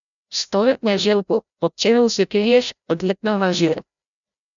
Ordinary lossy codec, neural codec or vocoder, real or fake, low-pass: MP3, 96 kbps; codec, 16 kHz, 0.5 kbps, FreqCodec, larger model; fake; 7.2 kHz